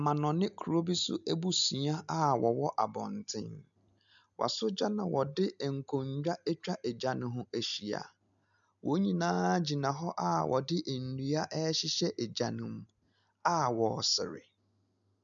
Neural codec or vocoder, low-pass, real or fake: none; 7.2 kHz; real